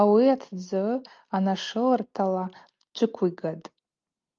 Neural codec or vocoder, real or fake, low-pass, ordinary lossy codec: none; real; 7.2 kHz; Opus, 32 kbps